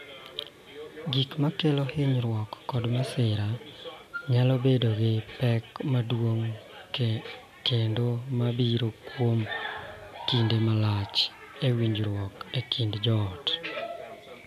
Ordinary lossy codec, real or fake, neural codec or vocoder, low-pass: AAC, 96 kbps; real; none; 14.4 kHz